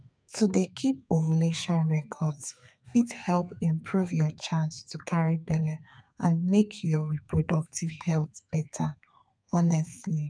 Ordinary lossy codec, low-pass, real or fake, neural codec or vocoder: none; 9.9 kHz; fake; codec, 32 kHz, 1.9 kbps, SNAC